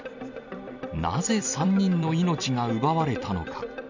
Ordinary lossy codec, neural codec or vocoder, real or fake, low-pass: none; vocoder, 44.1 kHz, 128 mel bands every 256 samples, BigVGAN v2; fake; 7.2 kHz